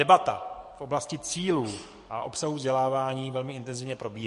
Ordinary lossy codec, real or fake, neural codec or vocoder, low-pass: MP3, 48 kbps; fake; codec, 44.1 kHz, 7.8 kbps, DAC; 14.4 kHz